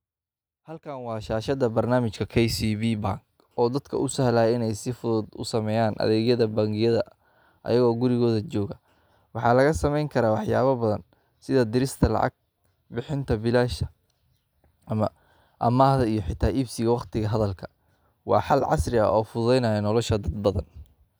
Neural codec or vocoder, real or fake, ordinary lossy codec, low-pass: none; real; none; none